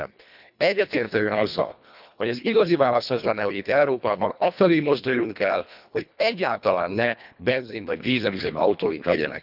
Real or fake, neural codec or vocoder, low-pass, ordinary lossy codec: fake; codec, 24 kHz, 1.5 kbps, HILCodec; 5.4 kHz; none